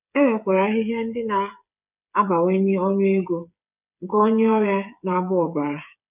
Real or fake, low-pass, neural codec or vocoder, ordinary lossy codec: fake; 3.6 kHz; codec, 16 kHz, 8 kbps, FreqCodec, smaller model; none